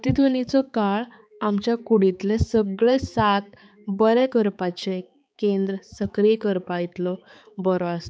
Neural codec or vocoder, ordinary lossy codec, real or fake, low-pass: codec, 16 kHz, 4 kbps, X-Codec, HuBERT features, trained on balanced general audio; none; fake; none